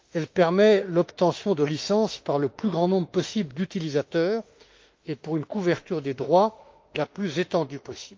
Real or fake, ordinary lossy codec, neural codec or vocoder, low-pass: fake; Opus, 32 kbps; autoencoder, 48 kHz, 32 numbers a frame, DAC-VAE, trained on Japanese speech; 7.2 kHz